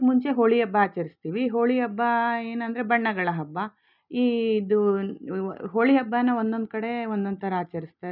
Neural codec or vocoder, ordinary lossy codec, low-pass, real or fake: none; none; 5.4 kHz; real